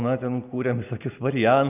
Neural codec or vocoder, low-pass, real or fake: none; 3.6 kHz; real